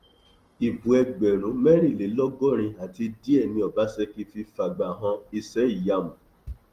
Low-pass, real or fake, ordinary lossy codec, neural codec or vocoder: 14.4 kHz; real; Opus, 24 kbps; none